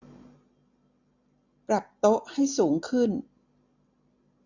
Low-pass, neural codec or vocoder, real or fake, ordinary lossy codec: 7.2 kHz; none; real; none